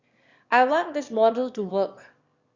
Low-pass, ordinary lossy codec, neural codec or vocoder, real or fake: 7.2 kHz; Opus, 64 kbps; autoencoder, 22.05 kHz, a latent of 192 numbers a frame, VITS, trained on one speaker; fake